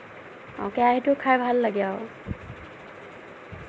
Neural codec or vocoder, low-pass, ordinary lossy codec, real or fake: none; none; none; real